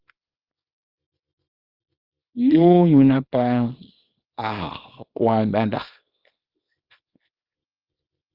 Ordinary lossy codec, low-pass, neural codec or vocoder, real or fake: Opus, 32 kbps; 5.4 kHz; codec, 24 kHz, 0.9 kbps, WavTokenizer, small release; fake